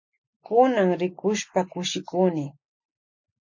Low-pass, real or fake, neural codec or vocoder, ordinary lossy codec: 7.2 kHz; real; none; MP3, 48 kbps